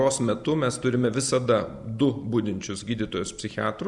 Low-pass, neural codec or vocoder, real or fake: 10.8 kHz; none; real